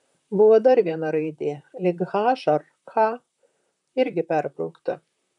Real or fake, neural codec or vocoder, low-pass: fake; vocoder, 44.1 kHz, 128 mel bands, Pupu-Vocoder; 10.8 kHz